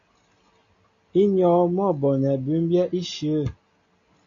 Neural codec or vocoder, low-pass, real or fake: none; 7.2 kHz; real